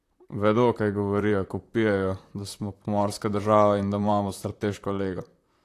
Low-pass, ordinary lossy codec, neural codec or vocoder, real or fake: 14.4 kHz; AAC, 64 kbps; vocoder, 44.1 kHz, 128 mel bands, Pupu-Vocoder; fake